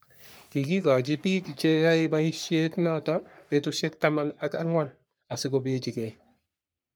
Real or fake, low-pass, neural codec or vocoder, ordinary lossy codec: fake; none; codec, 44.1 kHz, 3.4 kbps, Pupu-Codec; none